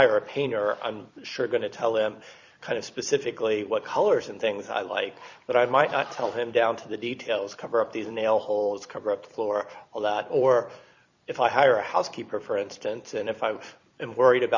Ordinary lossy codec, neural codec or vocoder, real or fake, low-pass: Opus, 64 kbps; vocoder, 44.1 kHz, 128 mel bands every 256 samples, BigVGAN v2; fake; 7.2 kHz